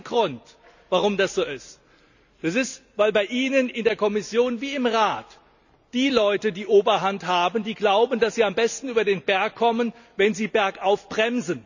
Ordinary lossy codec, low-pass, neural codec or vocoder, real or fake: none; 7.2 kHz; none; real